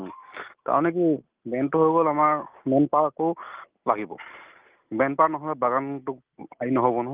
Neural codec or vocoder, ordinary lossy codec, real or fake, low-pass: none; Opus, 32 kbps; real; 3.6 kHz